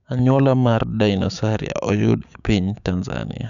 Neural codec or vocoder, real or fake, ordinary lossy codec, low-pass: codec, 16 kHz, 6 kbps, DAC; fake; none; 7.2 kHz